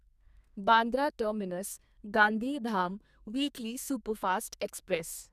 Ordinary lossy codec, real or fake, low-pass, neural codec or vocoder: none; fake; 14.4 kHz; codec, 32 kHz, 1.9 kbps, SNAC